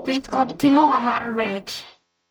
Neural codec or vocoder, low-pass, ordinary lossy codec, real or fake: codec, 44.1 kHz, 0.9 kbps, DAC; none; none; fake